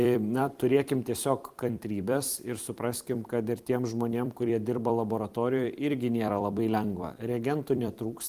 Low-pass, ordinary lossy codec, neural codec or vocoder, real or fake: 14.4 kHz; Opus, 24 kbps; vocoder, 44.1 kHz, 128 mel bands every 256 samples, BigVGAN v2; fake